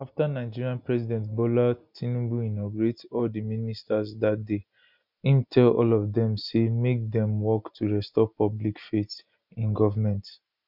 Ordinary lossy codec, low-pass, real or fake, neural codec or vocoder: none; 5.4 kHz; real; none